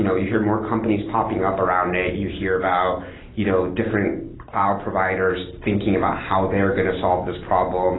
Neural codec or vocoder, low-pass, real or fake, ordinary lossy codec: none; 7.2 kHz; real; AAC, 16 kbps